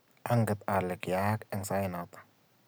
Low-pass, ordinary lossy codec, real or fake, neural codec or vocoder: none; none; real; none